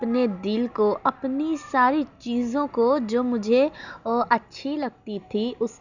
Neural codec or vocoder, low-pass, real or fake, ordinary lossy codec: none; 7.2 kHz; real; none